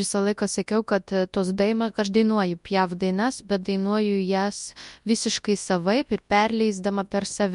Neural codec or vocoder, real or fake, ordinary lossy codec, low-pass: codec, 24 kHz, 0.9 kbps, WavTokenizer, large speech release; fake; MP3, 64 kbps; 10.8 kHz